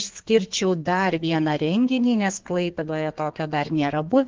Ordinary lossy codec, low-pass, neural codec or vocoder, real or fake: Opus, 16 kbps; 7.2 kHz; codec, 32 kHz, 1.9 kbps, SNAC; fake